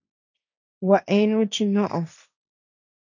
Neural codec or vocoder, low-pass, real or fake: codec, 16 kHz, 1.1 kbps, Voila-Tokenizer; 7.2 kHz; fake